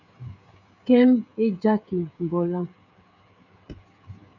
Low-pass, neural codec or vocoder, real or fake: 7.2 kHz; codec, 16 kHz, 16 kbps, FreqCodec, smaller model; fake